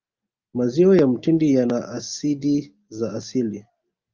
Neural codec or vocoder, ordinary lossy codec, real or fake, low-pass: none; Opus, 24 kbps; real; 7.2 kHz